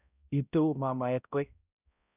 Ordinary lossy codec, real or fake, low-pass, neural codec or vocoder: none; fake; 3.6 kHz; codec, 16 kHz, 0.5 kbps, X-Codec, HuBERT features, trained on balanced general audio